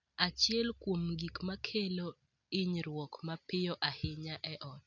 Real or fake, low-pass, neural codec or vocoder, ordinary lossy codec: real; 7.2 kHz; none; none